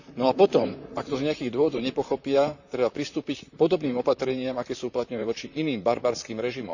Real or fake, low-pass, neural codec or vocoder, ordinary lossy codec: fake; 7.2 kHz; vocoder, 44.1 kHz, 128 mel bands, Pupu-Vocoder; none